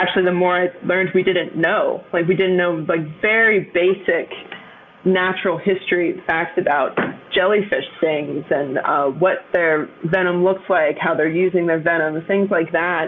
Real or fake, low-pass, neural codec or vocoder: real; 7.2 kHz; none